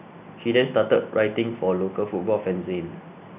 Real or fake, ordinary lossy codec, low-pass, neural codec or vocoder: real; none; 3.6 kHz; none